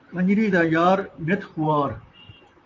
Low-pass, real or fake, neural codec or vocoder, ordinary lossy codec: 7.2 kHz; fake; vocoder, 44.1 kHz, 128 mel bands, Pupu-Vocoder; AAC, 48 kbps